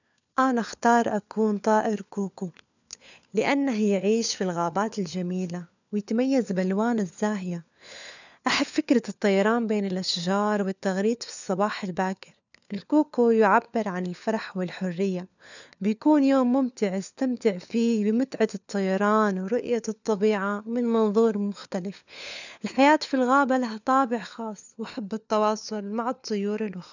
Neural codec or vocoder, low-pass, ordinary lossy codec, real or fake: codec, 16 kHz, 4 kbps, FunCodec, trained on LibriTTS, 50 frames a second; 7.2 kHz; none; fake